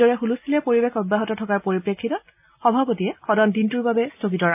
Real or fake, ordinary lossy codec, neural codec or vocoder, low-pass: real; AAC, 32 kbps; none; 3.6 kHz